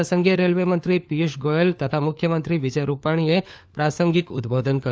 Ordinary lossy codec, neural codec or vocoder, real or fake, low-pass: none; codec, 16 kHz, 4 kbps, FunCodec, trained on LibriTTS, 50 frames a second; fake; none